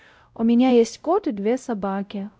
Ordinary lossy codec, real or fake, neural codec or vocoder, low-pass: none; fake; codec, 16 kHz, 0.5 kbps, X-Codec, WavLM features, trained on Multilingual LibriSpeech; none